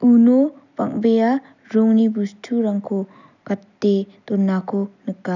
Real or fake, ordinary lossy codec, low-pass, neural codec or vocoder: real; none; 7.2 kHz; none